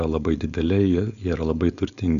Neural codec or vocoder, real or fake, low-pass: codec, 16 kHz, 4.8 kbps, FACodec; fake; 7.2 kHz